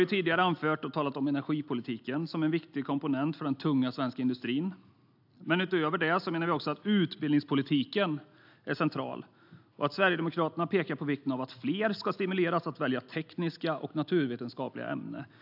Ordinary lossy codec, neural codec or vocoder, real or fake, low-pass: AAC, 48 kbps; none; real; 5.4 kHz